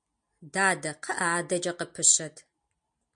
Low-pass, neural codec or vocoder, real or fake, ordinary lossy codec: 9.9 kHz; none; real; MP3, 64 kbps